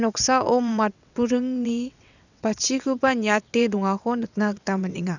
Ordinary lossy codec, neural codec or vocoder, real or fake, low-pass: none; vocoder, 22.05 kHz, 80 mel bands, WaveNeXt; fake; 7.2 kHz